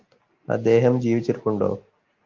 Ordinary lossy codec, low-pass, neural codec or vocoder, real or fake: Opus, 32 kbps; 7.2 kHz; none; real